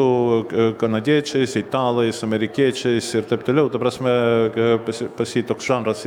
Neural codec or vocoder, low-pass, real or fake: autoencoder, 48 kHz, 128 numbers a frame, DAC-VAE, trained on Japanese speech; 19.8 kHz; fake